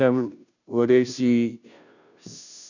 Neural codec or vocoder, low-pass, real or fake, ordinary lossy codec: codec, 16 kHz, 0.5 kbps, FunCodec, trained on Chinese and English, 25 frames a second; 7.2 kHz; fake; none